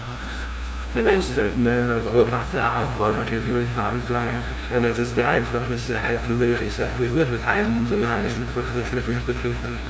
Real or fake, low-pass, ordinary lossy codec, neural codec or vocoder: fake; none; none; codec, 16 kHz, 0.5 kbps, FunCodec, trained on LibriTTS, 25 frames a second